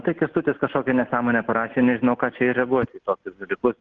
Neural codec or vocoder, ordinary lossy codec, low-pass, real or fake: none; Opus, 16 kbps; 7.2 kHz; real